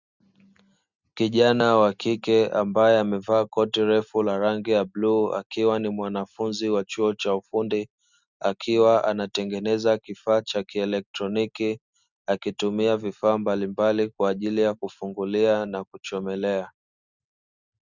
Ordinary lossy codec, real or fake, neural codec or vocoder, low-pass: Opus, 64 kbps; real; none; 7.2 kHz